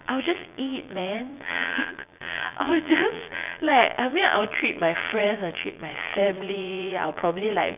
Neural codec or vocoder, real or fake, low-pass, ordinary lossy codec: vocoder, 22.05 kHz, 80 mel bands, Vocos; fake; 3.6 kHz; none